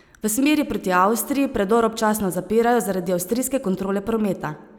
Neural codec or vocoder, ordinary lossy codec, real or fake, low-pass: none; none; real; 19.8 kHz